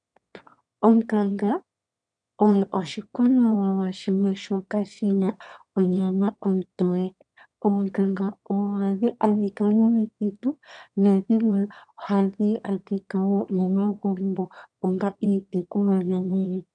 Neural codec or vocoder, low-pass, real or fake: autoencoder, 22.05 kHz, a latent of 192 numbers a frame, VITS, trained on one speaker; 9.9 kHz; fake